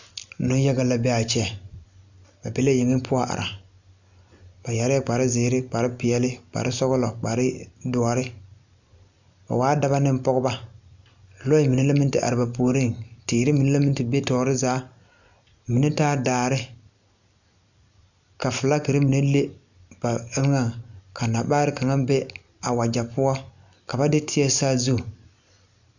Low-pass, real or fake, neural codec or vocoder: 7.2 kHz; real; none